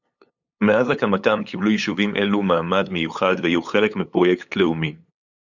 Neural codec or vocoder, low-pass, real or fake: codec, 16 kHz, 8 kbps, FunCodec, trained on LibriTTS, 25 frames a second; 7.2 kHz; fake